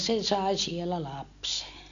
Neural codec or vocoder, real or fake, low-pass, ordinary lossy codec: none; real; 7.2 kHz; MP3, 64 kbps